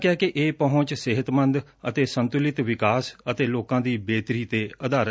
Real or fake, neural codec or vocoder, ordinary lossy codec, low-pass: real; none; none; none